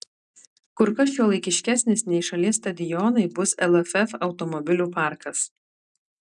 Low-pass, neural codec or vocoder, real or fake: 10.8 kHz; none; real